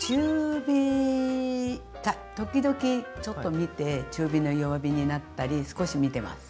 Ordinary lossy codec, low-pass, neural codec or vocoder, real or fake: none; none; none; real